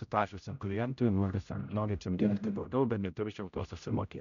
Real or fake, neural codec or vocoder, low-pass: fake; codec, 16 kHz, 0.5 kbps, X-Codec, HuBERT features, trained on general audio; 7.2 kHz